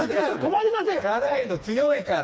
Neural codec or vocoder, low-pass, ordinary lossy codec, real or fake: codec, 16 kHz, 2 kbps, FreqCodec, smaller model; none; none; fake